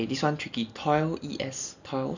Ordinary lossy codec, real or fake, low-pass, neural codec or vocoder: none; real; 7.2 kHz; none